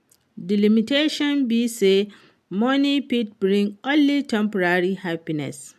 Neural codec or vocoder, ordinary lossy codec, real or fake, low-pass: none; AAC, 96 kbps; real; 14.4 kHz